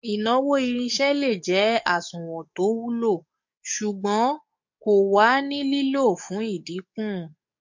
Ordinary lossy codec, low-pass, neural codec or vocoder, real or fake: MP3, 48 kbps; 7.2 kHz; codec, 44.1 kHz, 7.8 kbps, DAC; fake